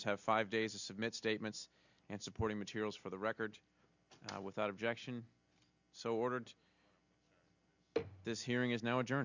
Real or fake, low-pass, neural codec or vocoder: real; 7.2 kHz; none